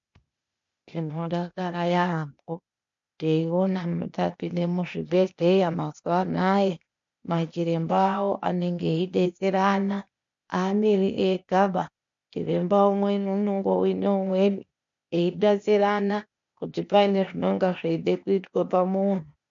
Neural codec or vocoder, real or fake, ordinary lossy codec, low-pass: codec, 16 kHz, 0.8 kbps, ZipCodec; fake; MP3, 48 kbps; 7.2 kHz